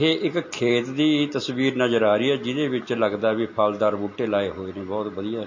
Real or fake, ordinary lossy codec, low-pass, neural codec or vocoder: real; MP3, 32 kbps; 7.2 kHz; none